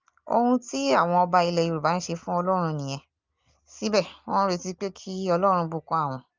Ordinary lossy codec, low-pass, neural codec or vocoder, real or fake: Opus, 24 kbps; 7.2 kHz; none; real